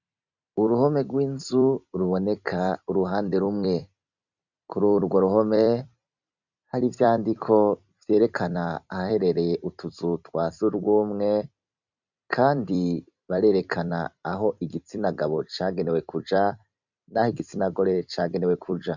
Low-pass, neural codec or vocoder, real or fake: 7.2 kHz; vocoder, 44.1 kHz, 128 mel bands every 256 samples, BigVGAN v2; fake